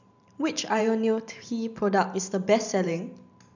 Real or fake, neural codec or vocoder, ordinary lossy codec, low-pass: fake; vocoder, 44.1 kHz, 128 mel bands every 512 samples, BigVGAN v2; none; 7.2 kHz